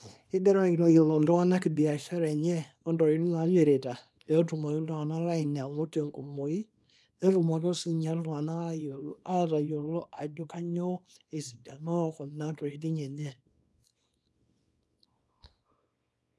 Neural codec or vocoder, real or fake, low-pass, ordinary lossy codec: codec, 24 kHz, 0.9 kbps, WavTokenizer, small release; fake; none; none